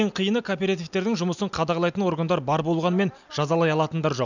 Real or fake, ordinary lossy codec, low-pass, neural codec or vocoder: real; none; 7.2 kHz; none